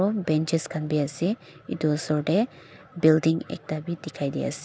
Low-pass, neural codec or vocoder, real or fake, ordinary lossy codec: none; none; real; none